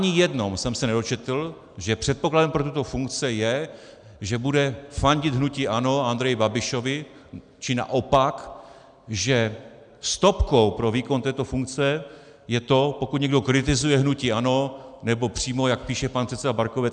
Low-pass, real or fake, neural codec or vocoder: 9.9 kHz; real; none